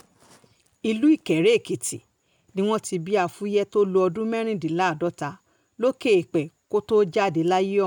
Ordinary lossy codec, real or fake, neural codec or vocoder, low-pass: none; real; none; 19.8 kHz